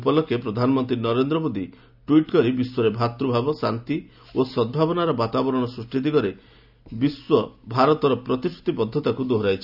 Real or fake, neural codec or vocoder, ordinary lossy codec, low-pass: real; none; none; 5.4 kHz